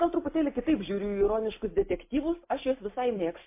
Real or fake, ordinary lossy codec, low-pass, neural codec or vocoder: real; MP3, 24 kbps; 3.6 kHz; none